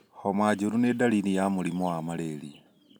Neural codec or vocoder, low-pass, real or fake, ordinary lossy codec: none; none; real; none